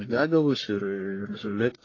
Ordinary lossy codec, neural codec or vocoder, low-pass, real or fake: AAC, 32 kbps; codec, 44.1 kHz, 1.7 kbps, Pupu-Codec; 7.2 kHz; fake